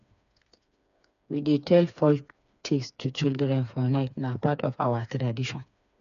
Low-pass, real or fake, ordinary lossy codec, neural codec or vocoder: 7.2 kHz; fake; none; codec, 16 kHz, 4 kbps, FreqCodec, smaller model